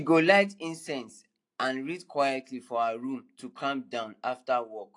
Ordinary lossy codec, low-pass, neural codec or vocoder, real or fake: AAC, 48 kbps; 10.8 kHz; none; real